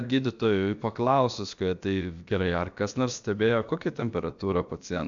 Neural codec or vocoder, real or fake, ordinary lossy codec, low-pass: codec, 16 kHz, about 1 kbps, DyCAST, with the encoder's durations; fake; MP3, 64 kbps; 7.2 kHz